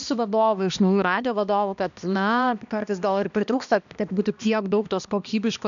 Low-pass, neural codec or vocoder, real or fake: 7.2 kHz; codec, 16 kHz, 1 kbps, X-Codec, HuBERT features, trained on balanced general audio; fake